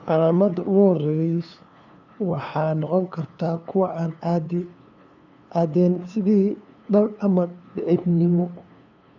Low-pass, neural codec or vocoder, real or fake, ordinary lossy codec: 7.2 kHz; codec, 16 kHz, 2 kbps, FunCodec, trained on LibriTTS, 25 frames a second; fake; none